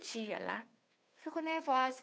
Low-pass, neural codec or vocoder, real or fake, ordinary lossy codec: none; codec, 16 kHz, 2 kbps, FunCodec, trained on Chinese and English, 25 frames a second; fake; none